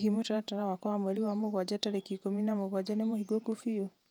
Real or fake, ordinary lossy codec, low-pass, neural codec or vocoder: fake; none; 19.8 kHz; vocoder, 48 kHz, 128 mel bands, Vocos